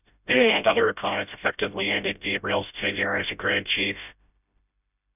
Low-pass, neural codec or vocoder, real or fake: 3.6 kHz; codec, 16 kHz, 0.5 kbps, FreqCodec, smaller model; fake